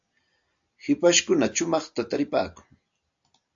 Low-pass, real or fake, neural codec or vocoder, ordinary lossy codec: 7.2 kHz; real; none; AAC, 64 kbps